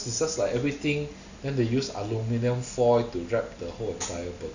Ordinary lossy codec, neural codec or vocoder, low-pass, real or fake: none; none; 7.2 kHz; real